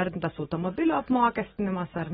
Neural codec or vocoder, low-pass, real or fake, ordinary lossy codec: none; 9.9 kHz; real; AAC, 16 kbps